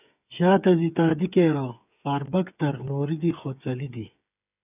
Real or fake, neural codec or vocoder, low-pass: fake; codec, 16 kHz, 8 kbps, FreqCodec, smaller model; 3.6 kHz